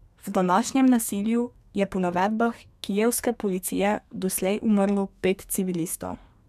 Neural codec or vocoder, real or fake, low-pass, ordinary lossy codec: codec, 32 kHz, 1.9 kbps, SNAC; fake; 14.4 kHz; none